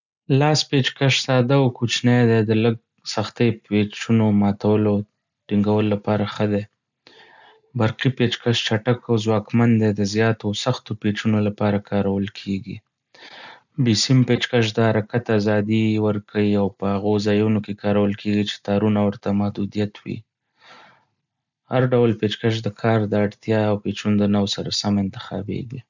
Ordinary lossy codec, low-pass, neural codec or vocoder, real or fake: none; 7.2 kHz; none; real